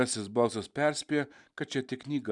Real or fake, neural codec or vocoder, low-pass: real; none; 10.8 kHz